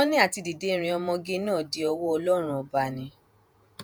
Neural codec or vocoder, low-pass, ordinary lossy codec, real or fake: vocoder, 48 kHz, 128 mel bands, Vocos; none; none; fake